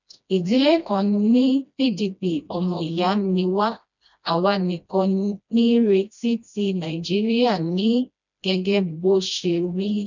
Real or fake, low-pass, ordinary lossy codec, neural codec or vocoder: fake; 7.2 kHz; none; codec, 16 kHz, 1 kbps, FreqCodec, smaller model